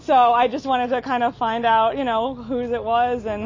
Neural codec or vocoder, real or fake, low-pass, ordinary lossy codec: none; real; 7.2 kHz; MP3, 32 kbps